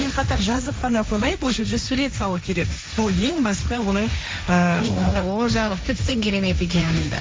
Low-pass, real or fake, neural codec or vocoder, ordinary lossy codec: none; fake; codec, 16 kHz, 1.1 kbps, Voila-Tokenizer; none